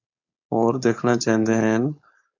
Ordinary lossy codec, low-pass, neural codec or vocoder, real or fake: AAC, 48 kbps; 7.2 kHz; codec, 16 kHz, 4.8 kbps, FACodec; fake